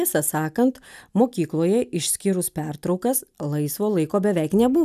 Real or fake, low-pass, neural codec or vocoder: real; 14.4 kHz; none